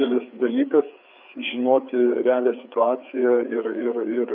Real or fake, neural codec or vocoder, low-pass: fake; codec, 16 kHz, 4 kbps, FreqCodec, larger model; 5.4 kHz